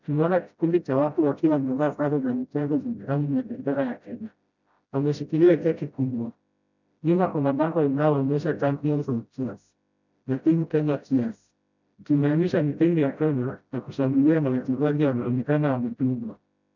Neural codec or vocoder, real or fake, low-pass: codec, 16 kHz, 0.5 kbps, FreqCodec, smaller model; fake; 7.2 kHz